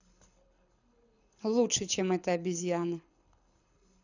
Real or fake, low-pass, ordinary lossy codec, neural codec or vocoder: fake; 7.2 kHz; none; codec, 24 kHz, 6 kbps, HILCodec